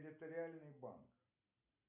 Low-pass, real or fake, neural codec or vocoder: 3.6 kHz; real; none